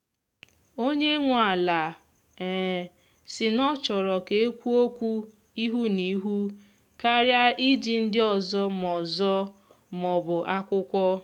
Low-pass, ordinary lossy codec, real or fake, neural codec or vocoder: 19.8 kHz; none; fake; codec, 44.1 kHz, 7.8 kbps, DAC